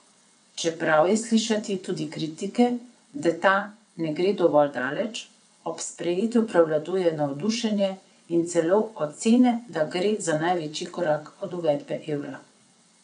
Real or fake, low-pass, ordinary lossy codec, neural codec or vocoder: fake; 9.9 kHz; none; vocoder, 22.05 kHz, 80 mel bands, WaveNeXt